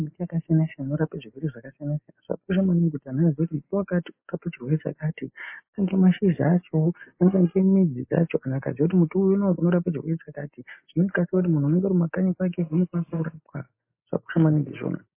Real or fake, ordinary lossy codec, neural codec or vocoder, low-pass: real; MP3, 24 kbps; none; 3.6 kHz